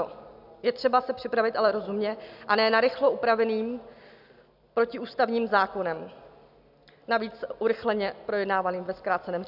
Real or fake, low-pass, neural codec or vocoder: real; 5.4 kHz; none